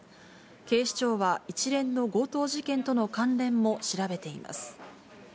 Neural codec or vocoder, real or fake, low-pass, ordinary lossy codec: none; real; none; none